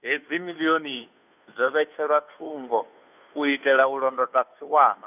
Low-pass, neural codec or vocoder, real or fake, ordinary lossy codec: 3.6 kHz; codec, 16 kHz, 2 kbps, FunCodec, trained on Chinese and English, 25 frames a second; fake; none